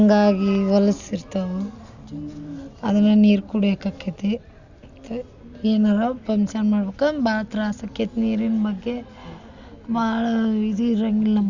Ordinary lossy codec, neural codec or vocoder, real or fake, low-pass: Opus, 64 kbps; none; real; 7.2 kHz